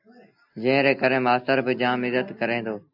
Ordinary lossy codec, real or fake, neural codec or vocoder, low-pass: AAC, 48 kbps; real; none; 5.4 kHz